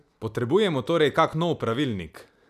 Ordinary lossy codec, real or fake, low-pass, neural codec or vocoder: none; real; 14.4 kHz; none